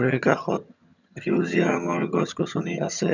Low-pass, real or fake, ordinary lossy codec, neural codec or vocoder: 7.2 kHz; fake; none; vocoder, 22.05 kHz, 80 mel bands, HiFi-GAN